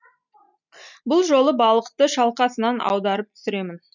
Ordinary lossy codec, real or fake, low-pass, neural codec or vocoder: none; real; 7.2 kHz; none